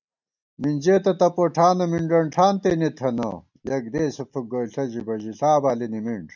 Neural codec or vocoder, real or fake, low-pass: none; real; 7.2 kHz